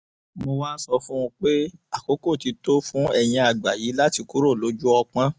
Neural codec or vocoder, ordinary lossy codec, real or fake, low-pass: none; none; real; none